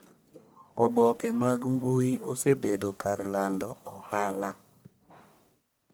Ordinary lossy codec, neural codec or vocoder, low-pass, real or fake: none; codec, 44.1 kHz, 1.7 kbps, Pupu-Codec; none; fake